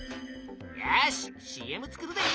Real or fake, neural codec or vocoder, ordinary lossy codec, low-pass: real; none; none; none